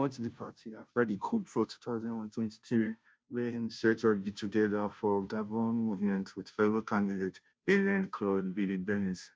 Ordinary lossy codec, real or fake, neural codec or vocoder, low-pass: none; fake; codec, 16 kHz, 0.5 kbps, FunCodec, trained on Chinese and English, 25 frames a second; none